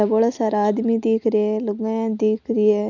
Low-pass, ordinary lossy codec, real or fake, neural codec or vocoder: 7.2 kHz; none; real; none